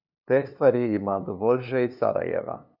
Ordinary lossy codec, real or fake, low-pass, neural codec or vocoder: none; fake; 5.4 kHz; codec, 16 kHz, 2 kbps, FunCodec, trained on LibriTTS, 25 frames a second